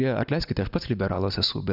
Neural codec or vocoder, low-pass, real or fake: none; 5.4 kHz; real